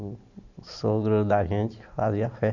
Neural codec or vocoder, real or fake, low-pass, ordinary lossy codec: none; real; 7.2 kHz; none